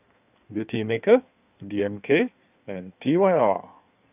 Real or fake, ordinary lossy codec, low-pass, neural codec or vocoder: fake; none; 3.6 kHz; codec, 16 kHz in and 24 kHz out, 1.1 kbps, FireRedTTS-2 codec